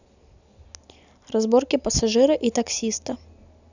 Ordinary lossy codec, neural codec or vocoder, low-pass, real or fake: none; none; 7.2 kHz; real